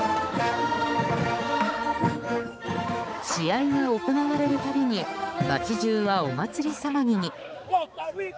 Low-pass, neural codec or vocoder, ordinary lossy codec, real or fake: none; codec, 16 kHz, 4 kbps, X-Codec, HuBERT features, trained on balanced general audio; none; fake